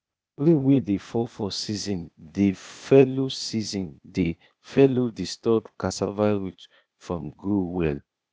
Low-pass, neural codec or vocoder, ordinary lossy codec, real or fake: none; codec, 16 kHz, 0.8 kbps, ZipCodec; none; fake